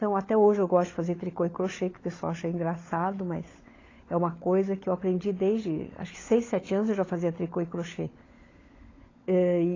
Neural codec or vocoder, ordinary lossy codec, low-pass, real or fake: codec, 16 kHz, 16 kbps, FunCodec, trained on LibriTTS, 50 frames a second; AAC, 32 kbps; 7.2 kHz; fake